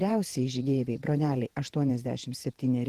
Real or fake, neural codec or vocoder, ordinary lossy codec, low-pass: fake; vocoder, 48 kHz, 128 mel bands, Vocos; Opus, 16 kbps; 14.4 kHz